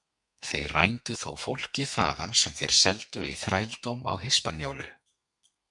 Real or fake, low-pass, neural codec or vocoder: fake; 10.8 kHz; codec, 32 kHz, 1.9 kbps, SNAC